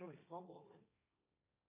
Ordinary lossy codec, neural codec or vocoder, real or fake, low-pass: AAC, 32 kbps; codec, 16 kHz, 1.1 kbps, Voila-Tokenizer; fake; 3.6 kHz